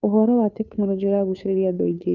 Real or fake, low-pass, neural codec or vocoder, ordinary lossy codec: fake; 7.2 kHz; codec, 16 kHz, 2 kbps, FunCodec, trained on Chinese and English, 25 frames a second; none